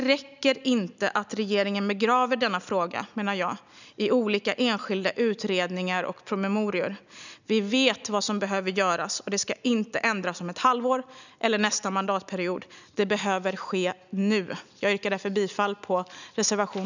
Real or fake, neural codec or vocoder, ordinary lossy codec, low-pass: real; none; none; 7.2 kHz